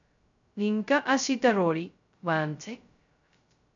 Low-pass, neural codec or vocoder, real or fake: 7.2 kHz; codec, 16 kHz, 0.2 kbps, FocalCodec; fake